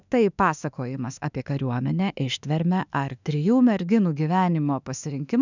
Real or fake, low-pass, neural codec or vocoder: fake; 7.2 kHz; autoencoder, 48 kHz, 32 numbers a frame, DAC-VAE, trained on Japanese speech